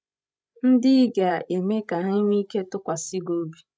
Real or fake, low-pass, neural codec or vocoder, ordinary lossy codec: fake; none; codec, 16 kHz, 16 kbps, FreqCodec, larger model; none